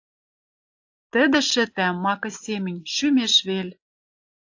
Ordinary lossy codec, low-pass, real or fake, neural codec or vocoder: AAC, 48 kbps; 7.2 kHz; real; none